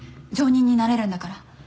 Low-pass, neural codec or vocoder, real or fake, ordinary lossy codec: none; none; real; none